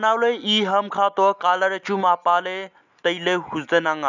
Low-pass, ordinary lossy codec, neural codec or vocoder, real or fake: 7.2 kHz; none; none; real